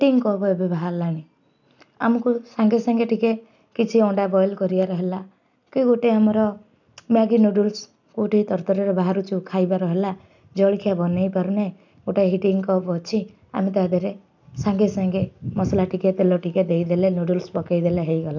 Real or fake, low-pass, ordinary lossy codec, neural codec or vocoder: real; 7.2 kHz; none; none